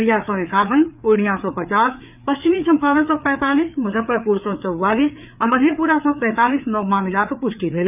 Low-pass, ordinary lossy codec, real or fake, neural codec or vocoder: 3.6 kHz; none; fake; codec, 16 kHz, 4 kbps, FreqCodec, larger model